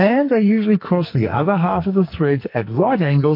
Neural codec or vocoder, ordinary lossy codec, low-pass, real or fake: codec, 44.1 kHz, 2.6 kbps, SNAC; MP3, 32 kbps; 5.4 kHz; fake